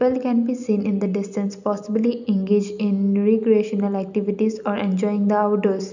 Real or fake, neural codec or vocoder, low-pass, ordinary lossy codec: real; none; 7.2 kHz; none